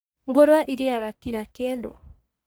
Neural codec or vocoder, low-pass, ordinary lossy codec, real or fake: codec, 44.1 kHz, 1.7 kbps, Pupu-Codec; none; none; fake